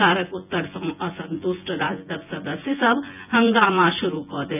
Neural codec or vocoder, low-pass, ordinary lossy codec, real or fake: vocoder, 24 kHz, 100 mel bands, Vocos; 3.6 kHz; none; fake